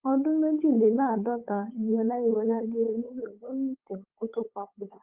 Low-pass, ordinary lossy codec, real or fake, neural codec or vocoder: 3.6 kHz; Opus, 24 kbps; fake; codec, 16 kHz, 8 kbps, FunCodec, trained on LibriTTS, 25 frames a second